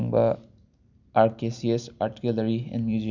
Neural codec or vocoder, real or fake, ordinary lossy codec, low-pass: none; real; none; 7.2 kHz